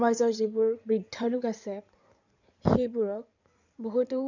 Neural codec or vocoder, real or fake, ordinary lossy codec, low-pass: codec, 16 kHz in and 24 kHz out, 2.2 kbps, FireRedTTS-2 codec; fake; none; 7.2 kHz